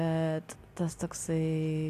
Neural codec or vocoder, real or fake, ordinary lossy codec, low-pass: none; real; AAC, 64 kbps; 14.4 kHz